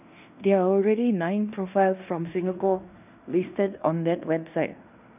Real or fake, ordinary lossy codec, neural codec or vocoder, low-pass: fake; none; codec, 16 kHz in and 24 kHz out, 0.9 kbps, LongCat-Audio-Codec, fine tuned four codebook decoder; 3.6 kHz